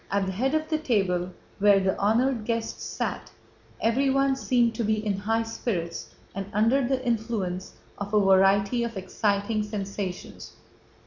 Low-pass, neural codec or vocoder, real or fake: 7.2 kHz; none; real